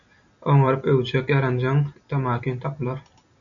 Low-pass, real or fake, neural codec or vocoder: 7.2 kHz; real; none